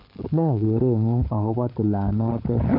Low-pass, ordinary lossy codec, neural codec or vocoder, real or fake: 5.4 kHz; MP3, 48 kbps; codec, 16 kHz, 16 kbps, FunCodec, trained on LibriTTS, 50 frames a second; fake